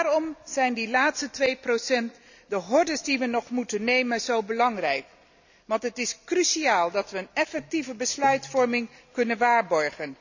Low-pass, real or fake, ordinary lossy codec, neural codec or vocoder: 7.2 kHz; real; none; none